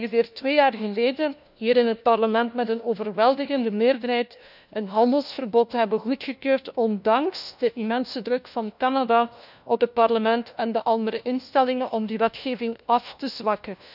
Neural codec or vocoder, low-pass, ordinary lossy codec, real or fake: codec, 16 kHz, 1 kbps, FunCodec, trained on LibriTTS, 50 frames a second; 5.4 kHz; none; fake